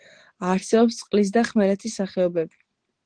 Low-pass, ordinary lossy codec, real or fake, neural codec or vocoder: 9.9 kHz; Opus, 16 kbps; real; none